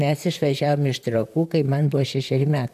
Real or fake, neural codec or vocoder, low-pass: fake; vocoder, 44.1 kHz, 128 mel bands, Pupu-Vocoder; 14.4 kHz